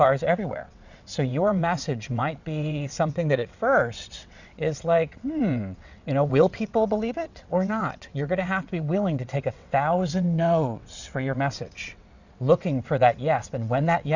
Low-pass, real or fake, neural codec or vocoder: 7.2 kHz; fake; vocoder, 22.05 kHz, 80 mel bands, WaveNeXt